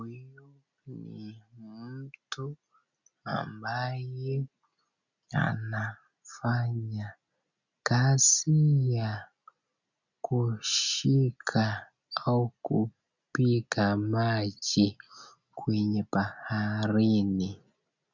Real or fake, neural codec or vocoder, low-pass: real; none; 7.2 kHz